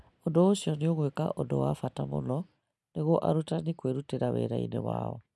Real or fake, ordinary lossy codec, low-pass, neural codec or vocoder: real; none; none; none